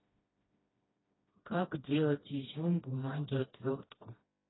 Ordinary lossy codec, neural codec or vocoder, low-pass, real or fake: AAC, 16 kbps; codec, 16 kHz, 1 kbps, FreqCodec, smaller model; 7.2 kHz; fake